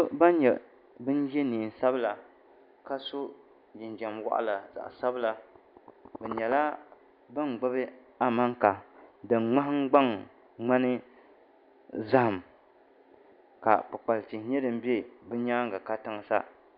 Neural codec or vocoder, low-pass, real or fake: none; 5.4 kHz; real